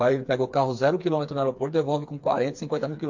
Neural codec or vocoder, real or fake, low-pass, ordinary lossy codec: codec, 16 kHz, 4 kbps, FreqCodec, smaller model; fake; 7.2 kHz; MP3, 48 kbps